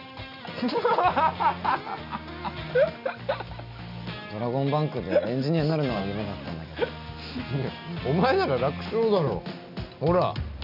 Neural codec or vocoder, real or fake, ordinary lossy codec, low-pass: none; real; none; 5.4 kHz